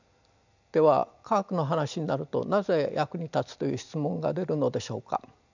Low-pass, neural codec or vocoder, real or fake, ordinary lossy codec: 7.2 kHz; none; real; none